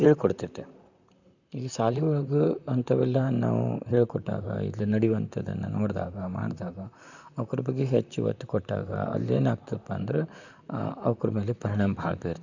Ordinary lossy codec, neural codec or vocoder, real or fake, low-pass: none; vocoder, 44.1 kHz, 128 mel bands, Pupu-Vocoder; fake; 7.2 kHz